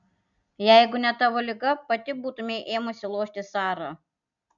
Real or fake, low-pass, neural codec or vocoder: real; 7.2 kHz; none